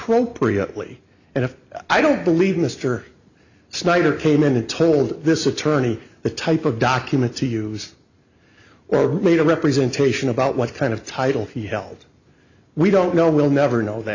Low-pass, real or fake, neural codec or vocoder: 7.2 kHz; real; none